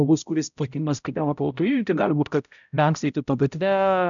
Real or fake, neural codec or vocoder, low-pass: fake; codec, 16 kHz, 0.5 kbps, X-Codec, HuBERT features, trained on balanced general audio; 7.2 kHz